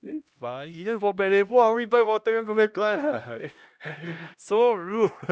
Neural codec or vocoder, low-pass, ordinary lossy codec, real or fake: codec, 16 kHz, 1 kbps, X-Codec, HuBERT features, trained on LibriSpeech; none; none; fake